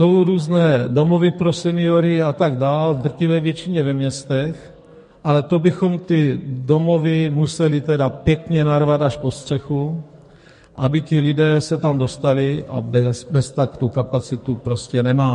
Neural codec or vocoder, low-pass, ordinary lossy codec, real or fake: codec, 44.1 kHz, 2.6 kbps, SNAC; 14.4 kHz; MP3, 48 kbps; fake